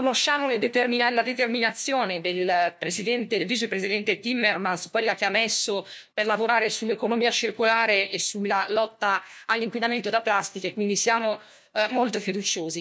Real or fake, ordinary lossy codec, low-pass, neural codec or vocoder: fake; none; none; codec, 16 kHz, 1 kbps, FunCodec, trained on LibriTTS, 50 frames a second